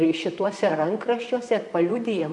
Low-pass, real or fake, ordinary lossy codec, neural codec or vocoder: 10.8 kHz; fake; MP3, 96 kbps; vocoder, 44.1 kHz, 128 mel bands, Pupu-Vocoder